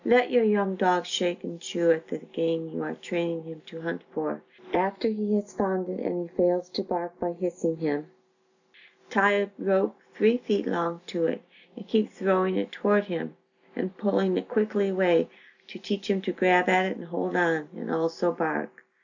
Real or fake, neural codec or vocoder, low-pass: real; none; 7.2 kHz